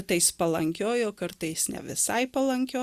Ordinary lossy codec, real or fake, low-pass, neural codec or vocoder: Opus, 64 kbps; real; 14.4 kHz; none